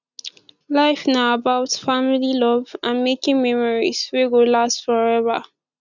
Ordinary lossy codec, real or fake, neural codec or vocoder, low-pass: none; real; none; 7.2 kHz